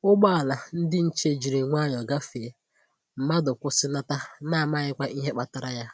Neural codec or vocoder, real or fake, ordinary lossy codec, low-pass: none; real; none; none